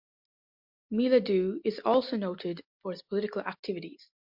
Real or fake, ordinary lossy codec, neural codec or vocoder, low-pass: real; MP3, 48 kbps; none; 5.4 kHz